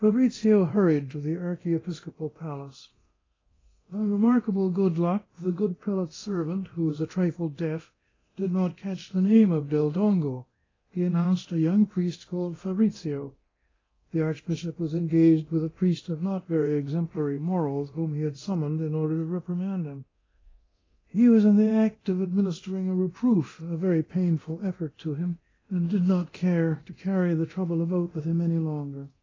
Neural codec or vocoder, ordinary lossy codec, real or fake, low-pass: codec, 24 kHz, 0.9 kbps, DualCodec; AAC, 32 kbps; fake; 7.2 kHz